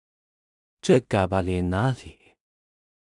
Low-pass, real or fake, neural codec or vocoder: 10.8 kHz; fake; codec, 16 kHz in and 24 kHz out, 0.4 kbps, LongCat-Audio-Codec, two codebook decoder